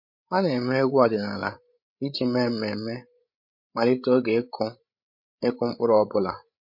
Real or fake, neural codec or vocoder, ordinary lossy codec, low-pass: fake; codec, 16 kHz, 16 kbps, FreqCodec, larger model; MP3, 32 kbps; 5.4 kHz